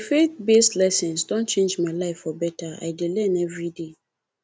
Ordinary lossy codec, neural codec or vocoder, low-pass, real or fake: none; none; none; real